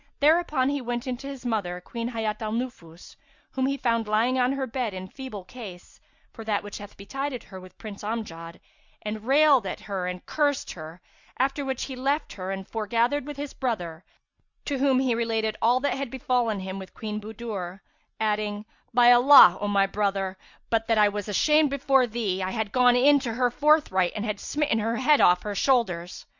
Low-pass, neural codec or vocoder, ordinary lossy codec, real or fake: 7.2 kHz; none; Opus, 64 kbps; real